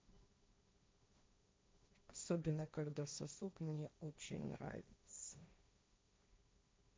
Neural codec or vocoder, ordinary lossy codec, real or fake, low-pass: codec, 16 kHz, 1.1 kbps, Voila-Tokenizer; none; fake; none